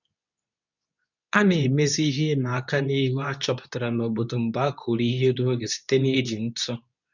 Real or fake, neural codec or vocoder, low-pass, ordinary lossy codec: fake; codec, 24 kHz, 0.9 kbps, WavTokenizer, medium speech release version 2; 7.2 kHz; none